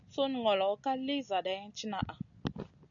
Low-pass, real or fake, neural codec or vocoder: 7.2 kHz; real; none